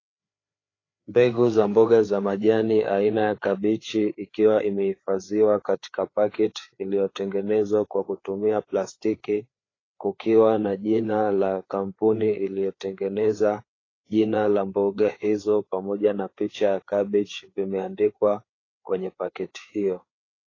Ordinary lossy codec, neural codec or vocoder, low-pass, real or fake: AAC, 32 kbps; codec, 16 kHz, 4 kbps, FreqCodec, larger model; 7.2 kHz; fake